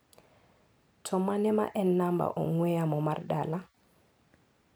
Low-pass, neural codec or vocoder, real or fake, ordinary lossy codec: none; none; real; none